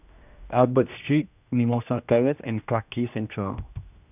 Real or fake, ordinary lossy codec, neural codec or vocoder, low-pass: fake; none; codec, 16 kHz, 1 kbps, X-Codec, HuBERT features, trained on general audio; 3.6 kHz